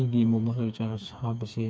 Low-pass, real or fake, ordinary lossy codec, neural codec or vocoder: none; fake; none; codec, 16 kHz, 4 kbps, FreqCodec, smaller model